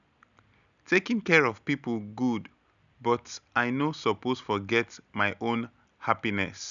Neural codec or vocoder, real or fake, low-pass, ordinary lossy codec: none; real; 7.2 kHz; none